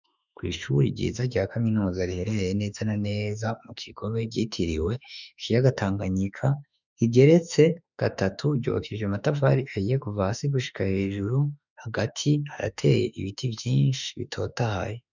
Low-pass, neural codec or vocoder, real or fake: 7.2 kHz; autoencoder, 48 kHz, 32 numbers a frame, DAC-VAE, trained on Japanese speech; fake